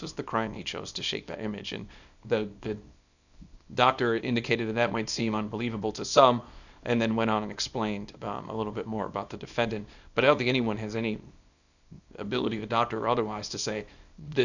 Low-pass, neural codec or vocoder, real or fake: 7.2 kHz; codec, 24 kHz, 0.9 kbps, WavTokenizer, small release; fake